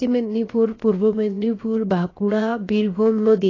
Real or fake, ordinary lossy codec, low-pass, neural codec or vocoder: fake; AAC, 32 kbps; 7.2 kHz; codec, 16 kHz, 0.7 kbps, FocalCodec